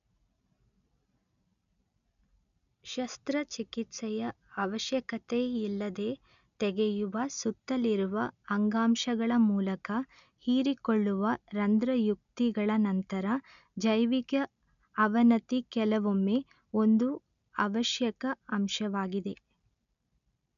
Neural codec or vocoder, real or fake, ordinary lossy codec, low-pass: none; real; none; 7.2 kHz